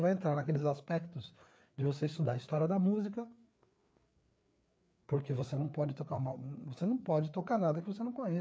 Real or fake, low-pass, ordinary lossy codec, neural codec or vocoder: fake; none; none; codec, 16 kHz, 4 kbps, FreqCodec, larger model